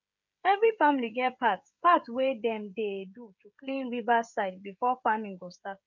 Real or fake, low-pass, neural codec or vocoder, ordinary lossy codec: fake; 7.2 kHz; codec, 16 kHz, 16 kbps, FreqCodec, smaller model; none